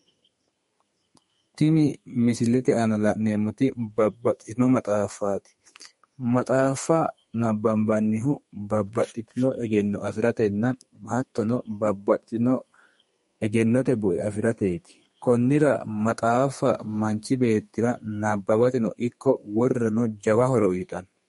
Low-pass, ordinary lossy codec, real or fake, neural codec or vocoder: 14.4 kHz; MP3, 48 kbps; fake; codec, 32 kHz, 1.9 kbps, SNAC